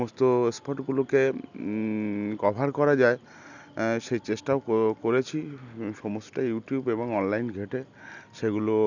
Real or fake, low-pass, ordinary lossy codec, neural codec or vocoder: real; 7.2 kHz; none; none